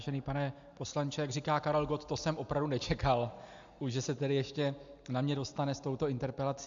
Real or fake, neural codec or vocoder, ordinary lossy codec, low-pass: real; none; AAC, 64 kbps; 7.2 kHz